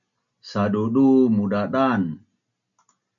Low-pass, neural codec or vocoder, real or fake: 7.2 kHz; none; real